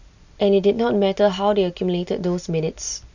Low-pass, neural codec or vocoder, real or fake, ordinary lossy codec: 7.2 kHz; none; real; none